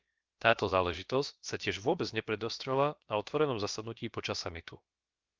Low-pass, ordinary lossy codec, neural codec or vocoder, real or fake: 7.2 kHz; Opus, 24 kbps; codec, 16 kHz, about 1 kbps, DyCAST, with the encoder's durations; fake